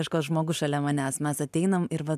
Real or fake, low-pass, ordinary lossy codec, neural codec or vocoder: real; 14.4 kHz; MP3, 96 kbps; none